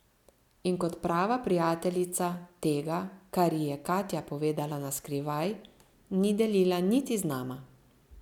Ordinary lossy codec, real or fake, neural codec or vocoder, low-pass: none; real; none; 19.8 kHz